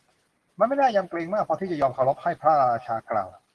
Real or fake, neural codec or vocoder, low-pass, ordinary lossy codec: real; none; 10.8 kHz; Opus, 16 kbps